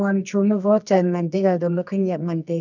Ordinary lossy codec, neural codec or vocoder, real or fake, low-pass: none; codec, 24 kHz, 0.9 kbps, WavTokenizer, medium music audio release; fake; 7.2 kHz